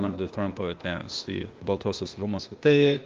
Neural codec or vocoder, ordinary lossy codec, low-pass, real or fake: codec, 16 kHz, 0.8 kbps, ZipCodec; Opus, 32 kbps; 7.2 kHz; fake